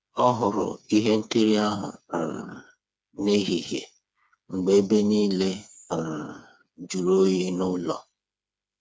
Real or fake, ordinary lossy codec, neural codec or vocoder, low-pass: fake; none; codec, 16 kHz, 4 kbps, FreqCodec, smaller model; none